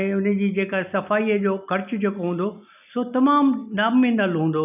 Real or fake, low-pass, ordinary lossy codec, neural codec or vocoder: real; 3.6 kHz; none; none